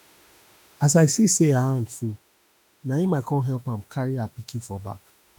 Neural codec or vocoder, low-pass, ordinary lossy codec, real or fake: autoencoder, 48 kHz, 32 numbers a frame, DAC-VAE, trained on Japanese speech; none; none; fake